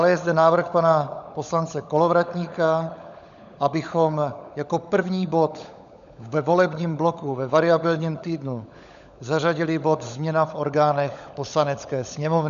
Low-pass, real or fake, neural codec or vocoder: 7.2 kHz; fake; codec, 16 kHz, 16 kbps, FunCodec, trained on LibriTTS, 50 frames a second